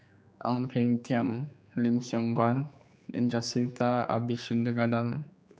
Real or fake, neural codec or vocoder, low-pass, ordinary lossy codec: fake; codec, 16 kHz, 2 kbps, X-Codec, HuBERT features, trained on general audio; none; none